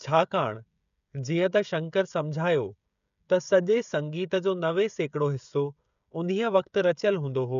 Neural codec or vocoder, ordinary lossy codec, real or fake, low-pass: codec, 16 kHz, 16 kbps, FreqCodec, smaller model; none; fake; 7.2 kHz